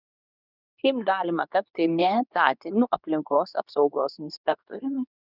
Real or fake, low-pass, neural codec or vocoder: fake; 5.4 kHz; codec, 24 kHz, 0.9 kbps, WavTokenizer, medium speech release version 2